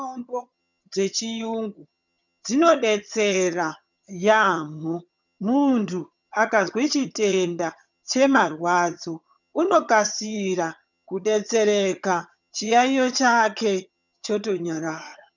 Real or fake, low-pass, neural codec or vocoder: fake; 7.2 kHz; vocoder, 22.05 kHz, 80 mel bands, HiFi-GAN